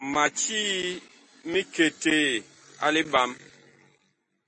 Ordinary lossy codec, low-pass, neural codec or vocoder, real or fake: MP3, 32 kbps; 10.8 kHz; none; real